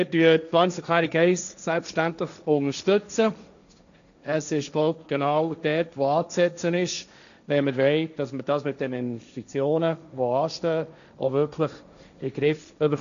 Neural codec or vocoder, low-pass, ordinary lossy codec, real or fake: codec, 16 kHz, 1.1 kbps, Voila-Tokenizer; 7.2 kHz; none; fake